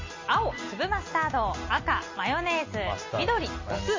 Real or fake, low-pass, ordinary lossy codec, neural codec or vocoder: real; 7.2 kHz; MP3, 32 kbps; none